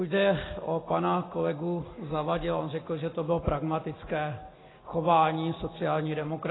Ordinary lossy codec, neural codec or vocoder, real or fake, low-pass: AAC, 16 kbps; none; real; 7.2 kHz